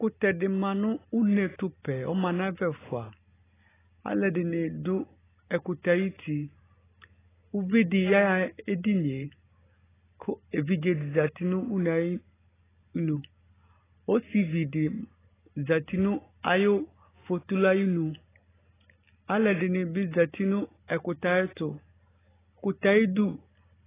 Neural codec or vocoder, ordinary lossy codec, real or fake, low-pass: none; AAC, 16 kbps; real; 3.6 kHz